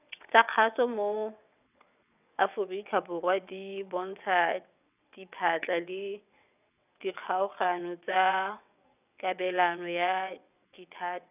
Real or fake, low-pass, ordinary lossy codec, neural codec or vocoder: fake; 3.6 kHz; none; vocoder, 22.05 kHz, 80 mel bands, WaveNeXt